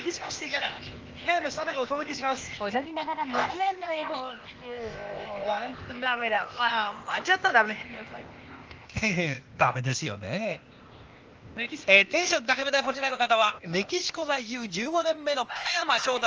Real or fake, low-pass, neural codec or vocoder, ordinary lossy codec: fake; 7.2 kHz; codec, 16 kHz, 0.8 kbps, ZipCodec; Opus, 24 kbps